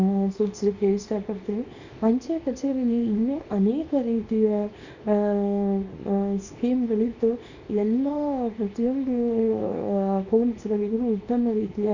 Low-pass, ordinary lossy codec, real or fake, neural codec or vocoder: 7.2 kHz; none; fake; codec, 24 kHz, 0.9 kbps, WavTokenizer, small release